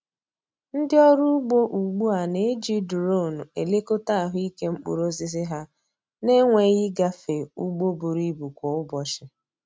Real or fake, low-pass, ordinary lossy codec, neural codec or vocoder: real; none; none; none